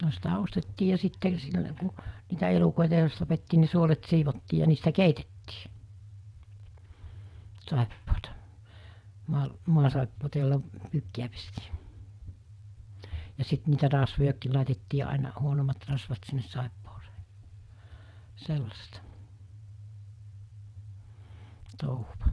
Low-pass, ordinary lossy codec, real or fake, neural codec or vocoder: none; none; fake; vocoder, 22.05 kHz, 80 mel bands, WaveNeXt